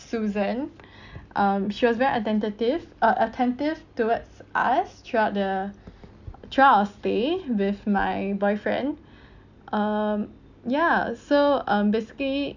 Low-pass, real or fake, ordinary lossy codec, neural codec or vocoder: 7.2 kHz; real; none; none